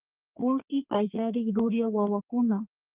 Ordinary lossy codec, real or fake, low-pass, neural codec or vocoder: Opus, 32 kbps; fake; 3.6 kHz; codec, 32 kHz, 1.9 kbps, SNAC